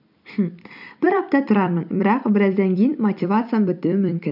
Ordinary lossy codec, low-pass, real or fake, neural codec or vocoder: none; 5.4 kHz; fake; vocoder, 44.1 kHz, 128 mel bands every 256 samples, BigVGAN v2